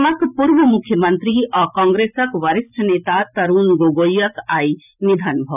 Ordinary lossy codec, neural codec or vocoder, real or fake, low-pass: none; none; real; 3.6 kHz